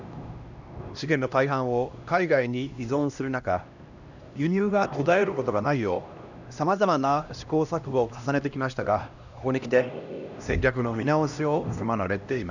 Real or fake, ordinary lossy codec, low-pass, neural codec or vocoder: fake; none; 7.2 kHz; codec, 16 kHz, 1 kbps, X-Codec, HuBERT features, trained on LibriSpeech